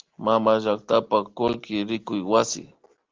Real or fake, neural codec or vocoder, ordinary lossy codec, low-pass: real; none; Opus, 16 kbps; 7.2 kHz